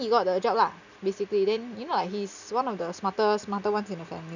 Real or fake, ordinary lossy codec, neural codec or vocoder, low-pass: real; none; none; 7.2 kHz